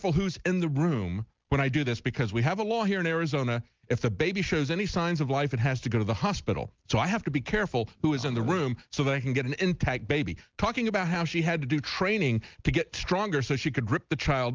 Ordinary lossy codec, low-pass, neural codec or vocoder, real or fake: Opus, 32 kbps; 7.2 kHz; none; real